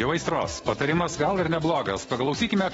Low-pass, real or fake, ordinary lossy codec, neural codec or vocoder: 19.8 kHz; fake; AAC, 24 kbps; autoencoder, 48 kHz, 128 numbers a frame, DAC-VAE, trained on Japanese speech